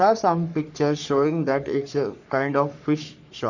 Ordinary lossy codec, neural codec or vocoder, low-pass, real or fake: none; codec, 44.1 kHz, 3.4 kbps, Pupu-Codec; 7.2 kHz; fake